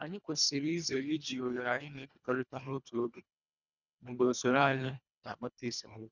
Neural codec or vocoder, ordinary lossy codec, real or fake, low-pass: codec, 24 kHz, 1.5 kbps, HILCodec; none; fake; 7.2 kHz